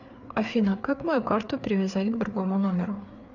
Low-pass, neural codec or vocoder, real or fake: 7.2 kHz; codec, 16 kHz, 4 kbps, FreqCodec, larger model; fake